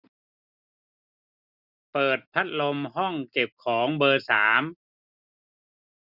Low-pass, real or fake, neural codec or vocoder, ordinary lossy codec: 5.4 kHz; real; none; none